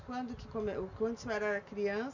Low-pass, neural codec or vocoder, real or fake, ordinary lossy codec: 7.2 kHz; none; real; none